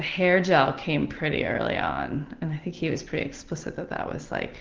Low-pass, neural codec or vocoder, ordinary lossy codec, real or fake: 7.2 kHz; none; Opus, 16 kbps; real